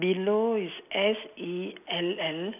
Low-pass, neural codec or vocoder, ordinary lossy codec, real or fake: 3.6 kHz; none; none; real